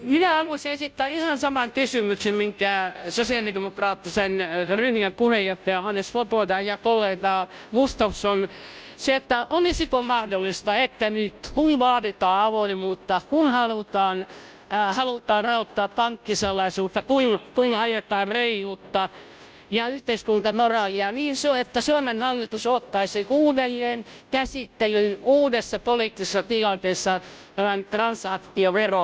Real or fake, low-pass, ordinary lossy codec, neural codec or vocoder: fake; none; none; codec, 16 kHz, 0.5 kbps, FunCodec, trained on Chinese and English, 25 frames a second